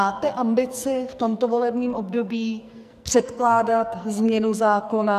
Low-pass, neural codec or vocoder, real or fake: 14.4 kHz; codec, 44.1 kHz, 2.6 kbps, SNAC; fake